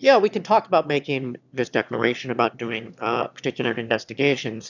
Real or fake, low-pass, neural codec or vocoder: fake; 7.2 kHz; autoencoder, 22.05 kHz, a latent of 192 numbers a frame, VITS, trained on one speaker